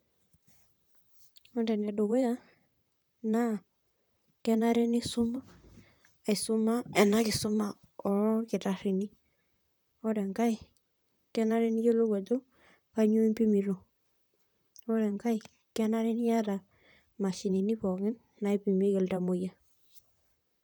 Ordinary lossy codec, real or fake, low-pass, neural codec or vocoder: none; fake; none; vocoder, 44.1 kHz, 128 mel bands, Pupu-Vocoder